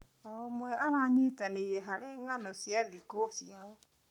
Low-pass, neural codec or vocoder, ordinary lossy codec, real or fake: 19.8 kHz; codec, 44.1 kHz, 7.8 kbps, Pupu-Codec; none; fake